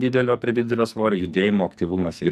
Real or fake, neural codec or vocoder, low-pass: fake; codec, 44.1 kHz, 2.6 kbps, SNAC; 14.4 kHz